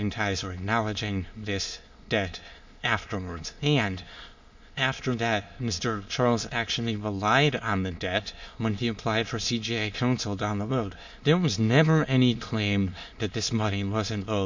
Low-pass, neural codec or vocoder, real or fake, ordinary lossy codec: 7.2 kHz; autoencoder, 22.05 kHz, a latent of 192 numbers a frame, VITS, trained on many speakers; fake; MP3, 48 kbps